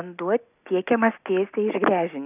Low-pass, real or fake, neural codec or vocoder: 3.6 kHz; real; none